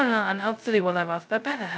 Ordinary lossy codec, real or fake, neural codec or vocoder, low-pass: none; fake; codec, 16 kHz, 0.2 kbps, FocalCodec; none